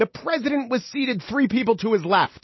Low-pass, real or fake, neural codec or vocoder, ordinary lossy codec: 7.2 kHz; real; none; MP3, 24 kbps